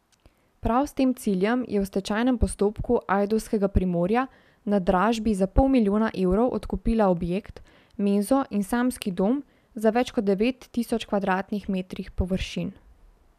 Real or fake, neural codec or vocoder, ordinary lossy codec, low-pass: real; none; none; 14.4 kHz